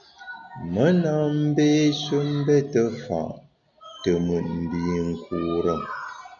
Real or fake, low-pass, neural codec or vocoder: real; 7.2 kHz; none